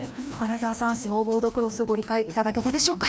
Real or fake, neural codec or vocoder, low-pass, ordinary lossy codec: fake; codec, 16 kHz, 1 kbps, FreqCodec, larger model; none; none